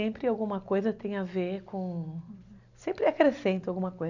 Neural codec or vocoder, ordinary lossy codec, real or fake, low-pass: none; none; real; 7.2 kHz